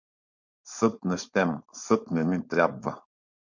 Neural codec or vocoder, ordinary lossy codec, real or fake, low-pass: codec, 16 kHz, 4.8 kbps, FACodec; MP3, 64 kbps; fake; 7.2 kHz